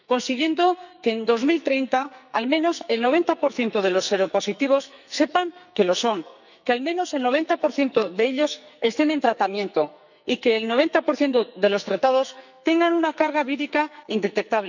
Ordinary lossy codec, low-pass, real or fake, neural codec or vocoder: none; 7.2 kHz; fake; codec, 44.1 kHz, 2.6 kbps, SNAC